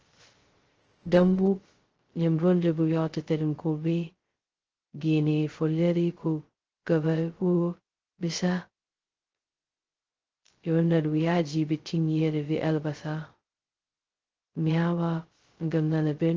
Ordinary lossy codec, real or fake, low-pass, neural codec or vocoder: Opus, 16 kbps; fake; 7.2 kHz; codec, 16 kHz, 0.2 kbps, FocalCodec